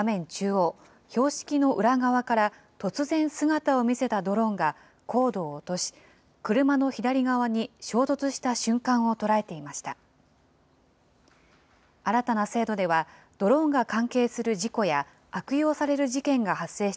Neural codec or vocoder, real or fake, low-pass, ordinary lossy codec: none; real; none; none